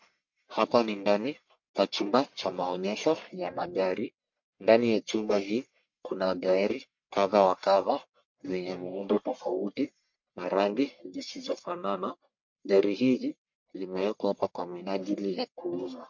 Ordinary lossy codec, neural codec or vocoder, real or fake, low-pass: MP3, 48 kbps; codec, 44.1 kHz, 1.7 kbps, Pupu-Codec; fake; 7.2 kHz